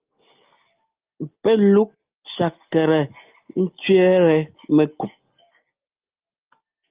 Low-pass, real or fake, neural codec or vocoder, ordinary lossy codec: 3.6 kHz; real; none; Opus, 32 kbps